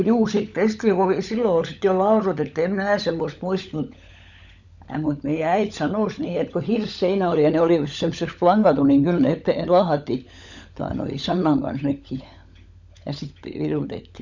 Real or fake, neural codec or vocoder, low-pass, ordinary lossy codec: fake; codec, 16 kHz, 16 kbps, FunCodec, trained on LibriTTS, 50 frames a second; 7.2 kHz; none